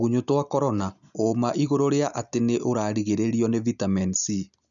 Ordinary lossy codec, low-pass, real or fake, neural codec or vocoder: none; 7.2 kHz; real; none